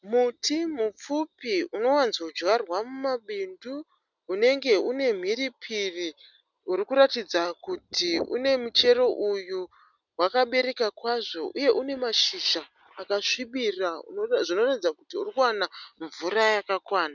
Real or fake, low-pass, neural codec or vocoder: real; 7.2 kHz; none